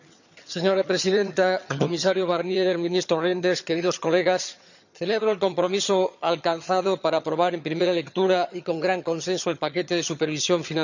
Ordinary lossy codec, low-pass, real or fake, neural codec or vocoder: none; 7.2 kHz; fake; vocoder, 22.05 kHz, 80 mel bands, HiFi-GAN